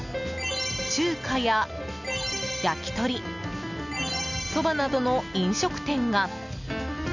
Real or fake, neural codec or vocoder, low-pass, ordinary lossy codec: real; none; 7.2 kHz; none